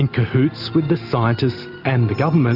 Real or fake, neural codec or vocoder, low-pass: real; none; 5.4 kHz